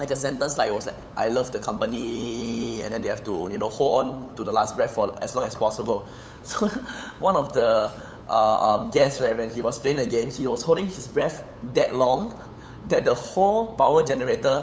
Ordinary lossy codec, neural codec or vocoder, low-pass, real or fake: none; codec, 16 kHz, 8 kbps, FunCodec, trained on LibriTTS, 25 frames a second; none; fake